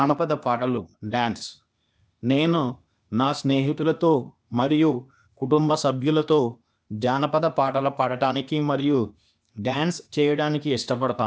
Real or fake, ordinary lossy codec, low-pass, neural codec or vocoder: fake; none; none; codec, 16 kHz, 0.8 kbps, ZipCodec